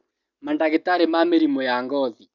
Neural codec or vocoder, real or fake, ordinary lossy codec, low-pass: none; real; none; 7.2 kHz